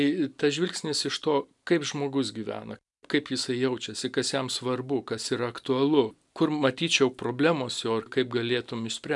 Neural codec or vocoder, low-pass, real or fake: none; 10.8 kHz; real